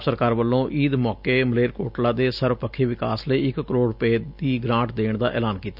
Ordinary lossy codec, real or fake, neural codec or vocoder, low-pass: none; real; none; 5.4 kHz